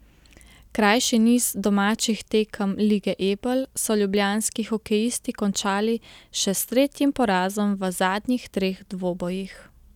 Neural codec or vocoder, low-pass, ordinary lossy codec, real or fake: none; 19.8 kHz; none; real